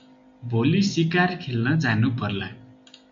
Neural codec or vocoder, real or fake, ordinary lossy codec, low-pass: none; real; MP3, 64 kbps; 7.2 kHz